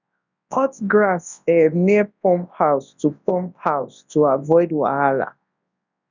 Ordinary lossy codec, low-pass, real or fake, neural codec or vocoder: none; 7.2 kHz; fake; codec, 24 kHz, 0.9 kbps, WavTokenizer, large speech release